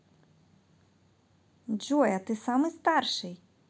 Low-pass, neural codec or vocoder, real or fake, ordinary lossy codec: none; none; real; none